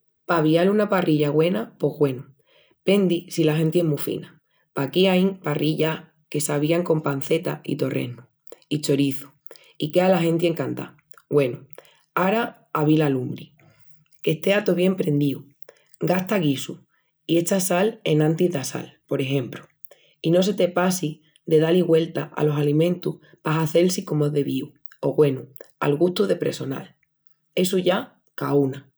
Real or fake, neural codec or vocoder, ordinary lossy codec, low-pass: real; none; none; none